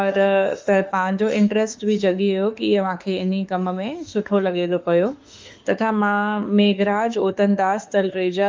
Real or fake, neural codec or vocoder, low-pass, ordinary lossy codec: fake; autoencoder, 48 kHz, 32 numbers a frame, DAC-VAE, trained on Japanese speech; 7.2 kHz; Opus, 32 kbps